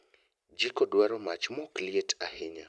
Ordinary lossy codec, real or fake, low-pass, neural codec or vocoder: none; real; none; none